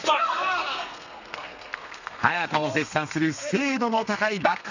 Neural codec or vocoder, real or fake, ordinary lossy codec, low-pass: codec, 32 kHz, 1.9 kbps, SNAC; fake; none; 7.2 kHz